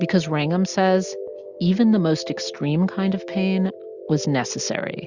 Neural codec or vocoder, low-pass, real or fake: none; 7.2 kHz; real